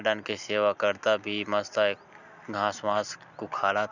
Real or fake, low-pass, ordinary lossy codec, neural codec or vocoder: real; 7.2 kHz; none; none